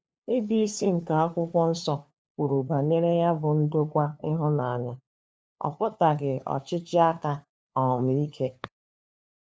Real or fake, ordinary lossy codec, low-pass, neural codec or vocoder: fake; none; none; codec, 16 kHz, 2 kbps, FunCodec, trained on LibriTTS, 25 frames a second